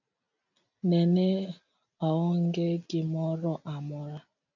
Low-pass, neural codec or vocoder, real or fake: 7.2 kHz; none; real